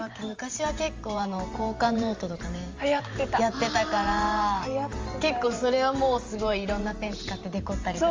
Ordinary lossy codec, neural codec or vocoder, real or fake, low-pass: Opus, 32 kbps; vocoder, 44.1 kHz, 128 mel bands every 512 samples, BigVGAN v2; fake; 7.2 kHz